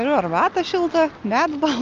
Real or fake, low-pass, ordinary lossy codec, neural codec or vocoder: real; 7.2 kHz; Opus, 24 kbps; none